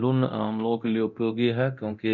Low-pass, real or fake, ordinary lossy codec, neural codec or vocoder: 7.2 kHz; fake; none; codec, 24 kHz, 0.9 kbps, DualCodec